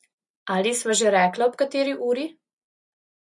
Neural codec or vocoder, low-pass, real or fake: none; 10.8 kHz; real